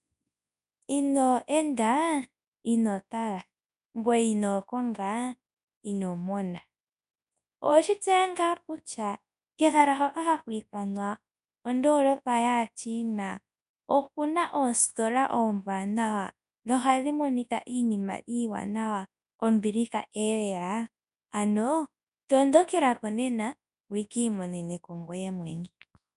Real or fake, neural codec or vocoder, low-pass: fake; codec, 24 kHz, 0.9 kbps, WavTokenizer, large speech release; 10.8 kHz